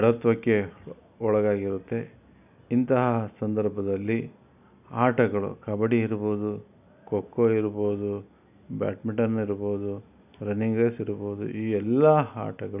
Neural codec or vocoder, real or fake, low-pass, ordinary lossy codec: none; real; 3.6 kHz; none